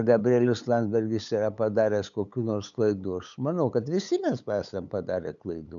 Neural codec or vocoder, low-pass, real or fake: codec, 16 kHz, 8 kbps, FunCodec, trained on LibriTTS, 25 frames a second; 7.2 kHz; fake